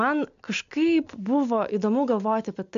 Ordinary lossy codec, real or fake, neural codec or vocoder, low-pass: MP3, 64 kbps; real; none; 7.2 kHz